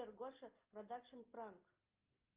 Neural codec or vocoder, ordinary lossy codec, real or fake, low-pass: none; Opus, 24 kbps; real; 3.6 kHz